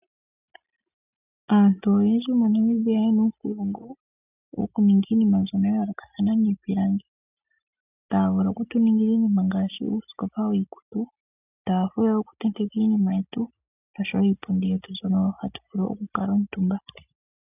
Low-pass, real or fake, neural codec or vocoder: 3.6 kHz; real; none